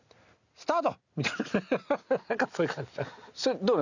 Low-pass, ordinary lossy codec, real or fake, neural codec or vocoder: 7.2 kHz; none; real; none